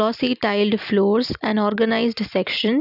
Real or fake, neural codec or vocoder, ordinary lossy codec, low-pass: real; none; none; 5.4 kHz